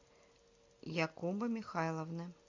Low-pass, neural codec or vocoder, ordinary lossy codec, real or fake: 7.2 kHz; none; MP3, 64 kbps; real